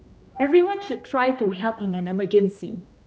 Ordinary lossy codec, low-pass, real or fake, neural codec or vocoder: none; none; fake; codec, 16 kHz, 1 kbps, X-Codec, HuBERT features, trained on general audio